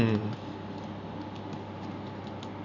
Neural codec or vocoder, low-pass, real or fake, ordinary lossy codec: none; 7.2 kHz; real; none